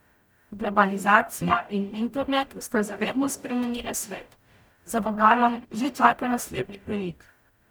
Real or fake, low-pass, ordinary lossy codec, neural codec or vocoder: fake; none; none; codec, 44.1 kHz, 0.9 kbps, DAC